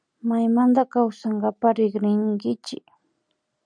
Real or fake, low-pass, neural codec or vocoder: real; 9.9 kHz; none